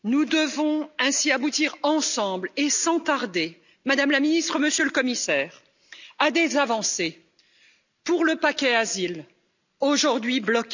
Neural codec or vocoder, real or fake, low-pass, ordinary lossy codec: none; real; 7.2 kHz; none